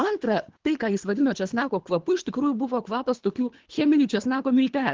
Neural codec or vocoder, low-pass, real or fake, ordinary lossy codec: codec, 24 kHz, 3 kbps, HILCodec; 7.2 kHz; fake; Opus, 16 kbps